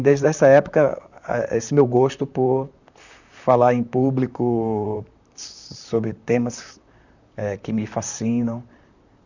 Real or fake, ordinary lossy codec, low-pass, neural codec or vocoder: fake; none; 7.2 kHz; vocoder, 44.1 kHz, 128 mel bands, Pupu-Vocoder